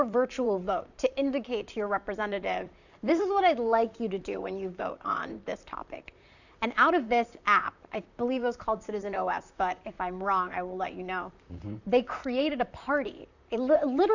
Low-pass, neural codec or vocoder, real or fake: 7.2 kHz; vocoder, 44.1 kHz, 128 mel bands, Pupu-Vocoder; fake